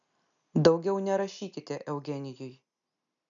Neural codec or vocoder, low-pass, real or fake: none; 7.2 kHz; real